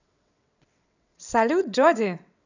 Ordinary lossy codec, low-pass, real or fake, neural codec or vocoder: none; 7.2 kHz; fake; vocoder, 22.05 kHz, 80 mel bands, WaveNeXt